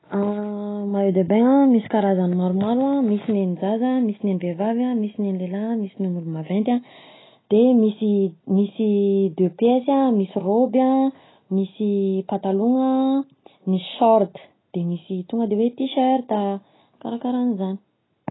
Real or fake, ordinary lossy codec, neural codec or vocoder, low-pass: real; AAC, 16 kbps; none; 7.2 kHz